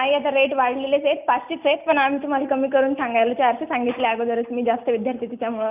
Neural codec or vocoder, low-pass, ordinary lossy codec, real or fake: none; 3.6 kHz; none; real